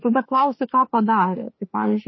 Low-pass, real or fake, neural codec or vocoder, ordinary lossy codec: 7.2 kHz; fake; autoencoder, 48 kHz, 32 numbers a frame, DAC-VAE, trained on Japanese speech; MP3, 24 kbps